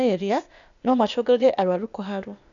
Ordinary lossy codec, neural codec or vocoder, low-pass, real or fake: none; codec, 16 kHz, 0.8 kbps, ZipCodec; 7.2 kHz; fake